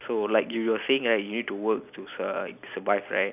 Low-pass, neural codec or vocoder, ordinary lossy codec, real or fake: 3.6 kHz; none; none; real